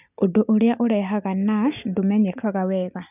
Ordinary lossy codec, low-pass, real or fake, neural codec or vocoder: none; 3.6 kHz; real; none